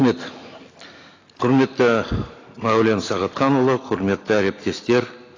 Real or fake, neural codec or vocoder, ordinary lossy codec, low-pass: real; none; AAC, 32 kbps; 7.2 kHz